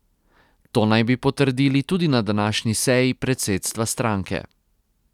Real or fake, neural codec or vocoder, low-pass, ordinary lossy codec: real; none; 19.8 kHz; none